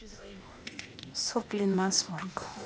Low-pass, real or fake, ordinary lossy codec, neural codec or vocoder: none; fake; none; codec, 16 kHz, 0.8 kbps, ZipCodec